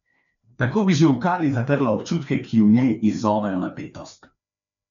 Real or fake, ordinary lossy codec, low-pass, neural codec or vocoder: fake; none; 7.2 kHz; codec, 16 kHz, 2 kbps, FreqCodec, larger model